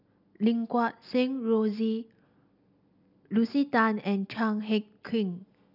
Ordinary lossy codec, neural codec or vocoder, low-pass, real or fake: none; none; 5.4 kHz; real